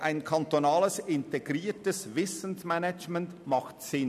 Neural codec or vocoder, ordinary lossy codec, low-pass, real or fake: none; none; 14.4 kHz; real